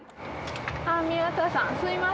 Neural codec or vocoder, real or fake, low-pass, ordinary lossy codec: none; real; none; none